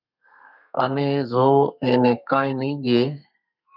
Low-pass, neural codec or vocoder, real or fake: 5.4 kHz; codec, 32 kHz, 1.9 kbps, SNAC; fake